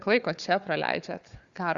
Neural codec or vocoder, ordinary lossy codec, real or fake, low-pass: codec, 16 kHz, 4 kbps, FunCodec, trained on Chinese and English, 50 frames a second; Opus, 64 kbps; fake; 7.2 kHz